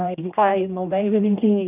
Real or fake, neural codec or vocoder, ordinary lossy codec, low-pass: fake; codec, 16 kHz, 1 kbps, X-Codec, HuBERT features, trained on general audio; none; 3.6 kHz